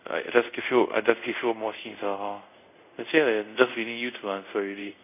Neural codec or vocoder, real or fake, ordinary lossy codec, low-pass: codec, 24 kHz, 0.5 kbps, DualCodec; fake; none; 3.6 kHz